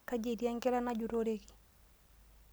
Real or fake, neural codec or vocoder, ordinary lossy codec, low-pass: real; none; none; none